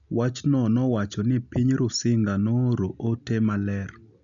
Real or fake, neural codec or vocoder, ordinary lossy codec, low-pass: real; none; none; 7.2 kHz